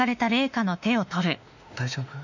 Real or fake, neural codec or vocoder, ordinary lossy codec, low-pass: fake; autoencoder, 48 kHz, 32 numbers a frame, DAC-VAE, trained on Japanese speech; none; 7.2 kHz